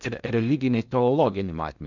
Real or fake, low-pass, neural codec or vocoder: fake; 7.2 kHz; codec, 16 kHz in and 24 kHz out, 0.8 kbps, FocalCodec, streaming, 65536 codes